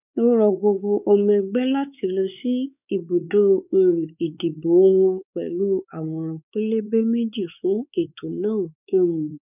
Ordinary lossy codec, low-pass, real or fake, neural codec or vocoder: none; 3.6 kHz; fake; codec, 16 kHz, 4 kbps, X-Codec, WavLM features, trained on Multilingual LibriSpeech